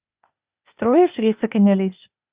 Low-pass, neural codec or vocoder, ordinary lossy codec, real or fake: 3.6 kHz; codec, 16 kHz, 0.8 kbps, ZipCodec; Opus, 64 kbps; fake